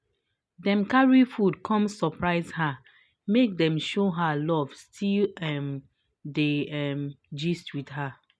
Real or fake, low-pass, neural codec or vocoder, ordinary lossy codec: real; none; none; none